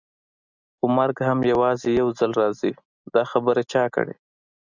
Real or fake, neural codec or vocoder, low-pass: real; none; 7.2 kHz